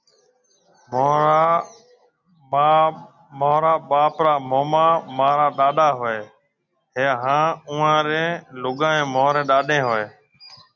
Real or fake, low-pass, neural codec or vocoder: real; 7.2 kHz; none